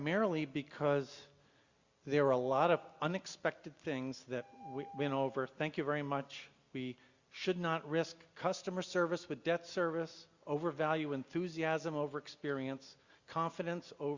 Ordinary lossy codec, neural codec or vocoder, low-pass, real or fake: Opus, 64 kbps; none; 7.2 kHz; real